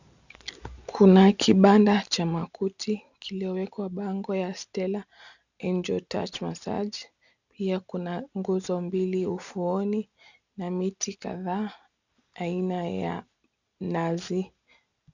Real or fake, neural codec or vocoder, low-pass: real; none; 7.2 kHz